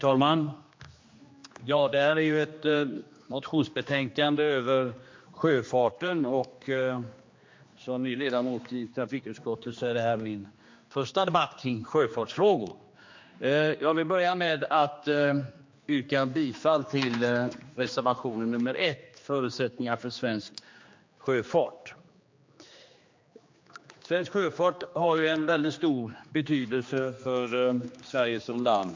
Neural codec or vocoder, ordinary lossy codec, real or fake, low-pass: codec, 16 kHz, 2 kbps, X-Codec, HuBERT features, trained on general audio; MP3, 48 kbps; fake; 7.2 kHz